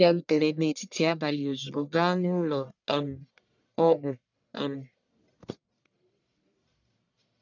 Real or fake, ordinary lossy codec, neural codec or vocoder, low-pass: fake; none; codec, 44.1 kHz, 1.7 kbps, Pupu-Codec; 7.2 kHz